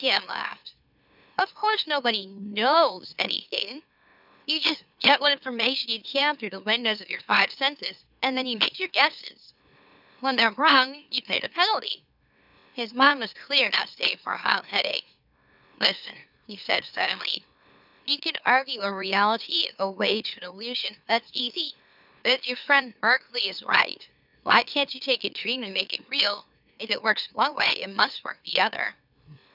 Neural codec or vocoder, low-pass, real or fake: autoencoder, 44.1 kHz, a latent of 192 numbers a frame, MeloTTS; 5.4 kHz; fake